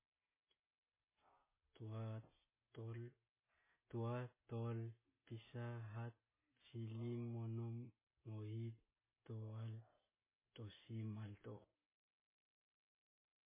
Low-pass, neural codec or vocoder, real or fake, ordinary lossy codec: 3.6 kHz; none; real; MP3, 16 kbps